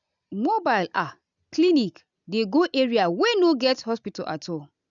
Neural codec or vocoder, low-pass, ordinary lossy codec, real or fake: none; 7.2 kHz; none; real